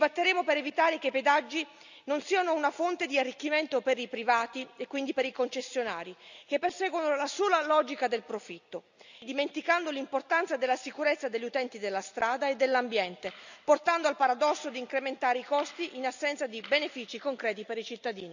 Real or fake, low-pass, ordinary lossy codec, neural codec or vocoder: real; 7.2 kHz; none; none